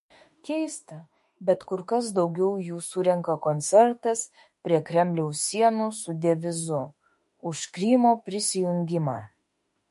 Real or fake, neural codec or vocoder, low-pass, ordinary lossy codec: fake; autoencoder, 48 kHz, 32 numbers a frame, DAC-VAE, trained on Japanese speech; 14.4 kHz; MP3, 48 kbps